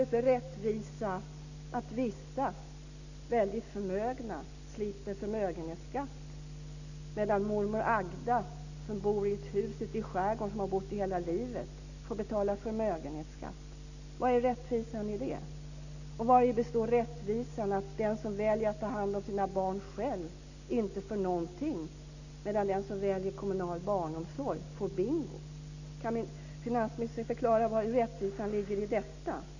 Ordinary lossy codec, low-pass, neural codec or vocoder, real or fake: none; 7.2 kHz; none; real